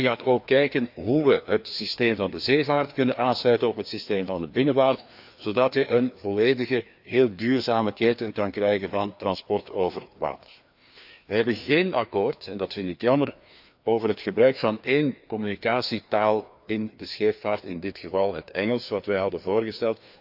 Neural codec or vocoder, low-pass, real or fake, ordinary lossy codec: codec, 16 kHz, 2 kbps, FreqCodec, larger model; 5.4 kHz; fake; AAC, 48 kbps